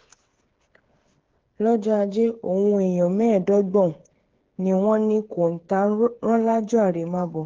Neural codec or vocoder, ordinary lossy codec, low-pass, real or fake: codec, 16 kHz, 8 kbps, FreqCodec, smaller model; Opus, 16 kbps; 7.2 kHz; fake